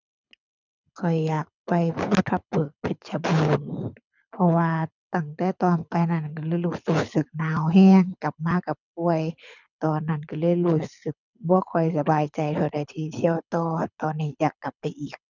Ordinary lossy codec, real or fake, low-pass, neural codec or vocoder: none; fake; 7.2 kHz; codec, 24 kHz, 6 kbps, HILCodec